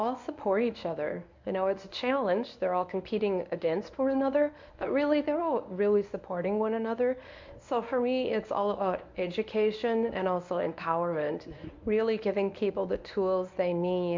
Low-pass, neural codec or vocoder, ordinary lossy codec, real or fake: 7.2 kHz; codec, 24 kHz, 0.9 kbps, WavTokenizer, medium speech release version 1; MP3, 64 kbps; fake